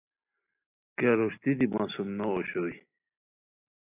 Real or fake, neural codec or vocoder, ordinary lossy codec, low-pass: real; none; AAC, 24 kbps; 3.6 kHz